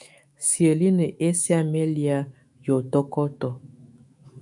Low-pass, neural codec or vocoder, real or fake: 10.8 kHz; autoencoder, 48 kHz, 128 numbers a frame, DAC-VAE, trained on Japanese speech; fake